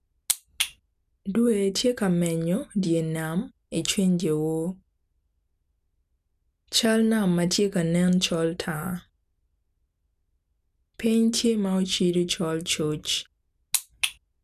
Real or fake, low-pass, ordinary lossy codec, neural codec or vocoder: real; 14.4 kHz; none; none